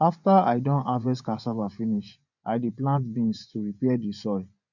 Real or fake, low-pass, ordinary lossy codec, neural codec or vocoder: fake; 7.2 kHz; none; vocoder, 24 kHz, 100 mel bands, Vocos